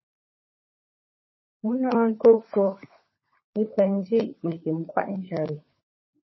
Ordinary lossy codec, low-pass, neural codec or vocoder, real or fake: MP3, 24 kbps; 7.2 kHz; codec, 16 kHz, 16 kbps, FunCodec, trained on LibriTTS, 50 frames a second; fake